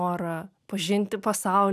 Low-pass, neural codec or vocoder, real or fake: 14.4 kHz; none; real